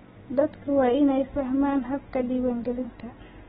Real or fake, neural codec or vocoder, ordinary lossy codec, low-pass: real; none; AAC, 16 kbps; 7.2 kHz